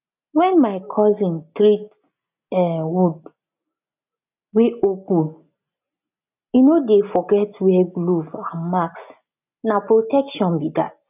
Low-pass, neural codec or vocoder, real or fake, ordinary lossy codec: 3.6 kHz; none; real; none